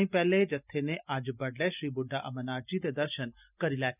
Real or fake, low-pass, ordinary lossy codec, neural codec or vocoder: real; 3.6 kHz; none; none